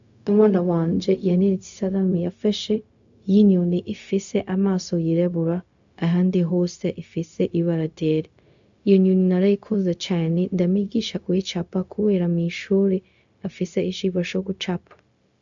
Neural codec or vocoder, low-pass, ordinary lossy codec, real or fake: codec, 16 kHz, 0.4 kbps, LongCat-Audio-Codec; 7.2 kHz; AAC, 64 kbps; fake